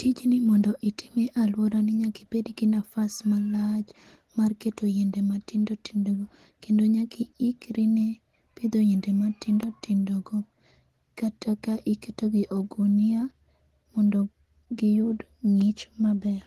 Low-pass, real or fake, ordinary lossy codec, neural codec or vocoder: 14.4 kHz; real; Opus, 16 kbps; none